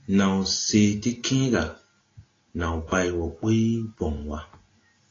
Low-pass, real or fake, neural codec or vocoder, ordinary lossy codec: 7.2 kHz; real; none; AAC, 32 kbps